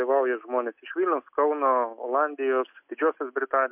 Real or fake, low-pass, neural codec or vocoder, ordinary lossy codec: real; 3.6 kHz; none; MP3, 32 kbps